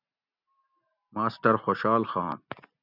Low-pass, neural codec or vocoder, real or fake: 5.4 kHz; none; real